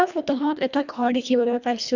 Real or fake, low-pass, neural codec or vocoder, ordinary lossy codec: fake; 7.2 kHz; codec, 24 kHz, 1.5 kbps, HILCodec; none